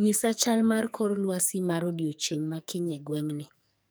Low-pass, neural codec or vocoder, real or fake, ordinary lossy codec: none; codec, 44.1 kHz, 2.6 kbps, SNAC; fake; none